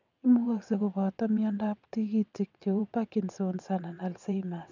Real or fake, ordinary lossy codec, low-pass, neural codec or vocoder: fake; none; 7.2 kHz; vocoder, 22.05 kHz, 80 mel bands, WaveNeXt